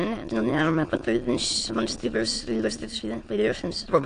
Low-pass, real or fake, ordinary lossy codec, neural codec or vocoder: 9.9 kHz; fake; AAC, 48 kbps; autoencoder, 22.05 kHz, a latent of 192 numbers a frame, VITS, trained on many speakers